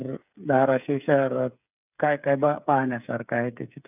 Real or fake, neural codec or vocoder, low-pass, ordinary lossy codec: fake; codec, 16 kHz, 8 kbps, FreqCodec, smaller model; 3.6 kHz; none